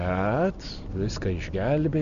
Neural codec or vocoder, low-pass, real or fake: none; 7.2 kHz; real